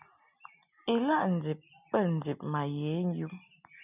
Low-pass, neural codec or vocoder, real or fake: 3.6 kHz; none; real